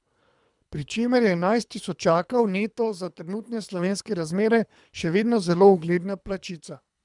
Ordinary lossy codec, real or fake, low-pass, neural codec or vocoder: none; fake; 10.8 kHz; codec, 24 kHz, 3 kbps, HILCodec